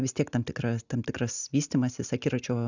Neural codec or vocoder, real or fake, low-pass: none; real; 7.2 kHz